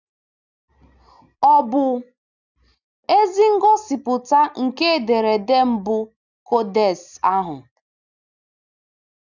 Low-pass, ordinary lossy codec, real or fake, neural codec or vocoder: 7.2 kHz; none; real; none